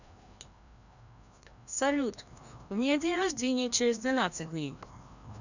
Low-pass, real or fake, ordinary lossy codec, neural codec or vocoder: 7.2 kHz; fake; none; codec, 16 kHz, 1 kbps, FreqCodec, larger model